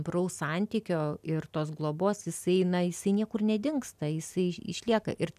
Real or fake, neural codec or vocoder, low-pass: real; none; 14.4 kHz